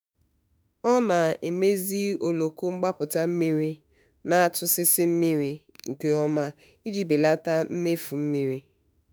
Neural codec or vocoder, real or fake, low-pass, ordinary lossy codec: autoencoder, 48 kHz, 32 numbers a frame, DAC-VAE, trained on Japanese speech; fake; none; none